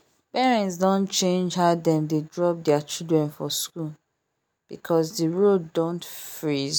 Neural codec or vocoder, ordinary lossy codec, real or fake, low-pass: none; none; real; none